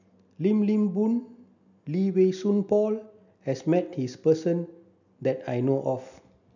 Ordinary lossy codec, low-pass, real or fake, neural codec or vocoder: none; 7.2 kHz; real; none